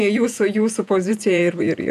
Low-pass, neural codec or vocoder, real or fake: 14.4 kHz; vocoder, 48 kHz, 128 mel bands, Vocos; fake